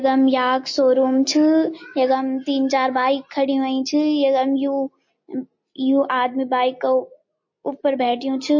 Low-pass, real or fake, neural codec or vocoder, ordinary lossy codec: 7.2 kHz; real; none; MP3, 32 kbps